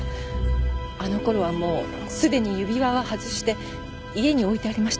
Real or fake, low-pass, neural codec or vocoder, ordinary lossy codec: real; none; none; none